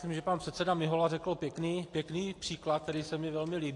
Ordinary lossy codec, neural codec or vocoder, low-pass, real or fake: AAC, 48 kbps; none; 10.8 kHz; real